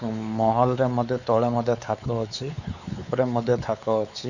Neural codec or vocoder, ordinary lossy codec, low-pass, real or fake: codec, 16 kHz, 4 kbps, X-Codec, WavLM features, trained on Multilingual LibriSpeech; none; 7.2 kHz; fake